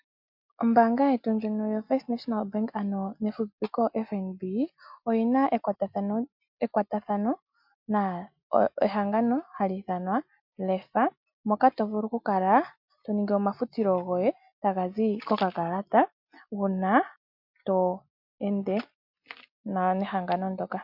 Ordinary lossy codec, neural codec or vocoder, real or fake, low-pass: MP3, 48 kbps; none; real; 5.4 kHz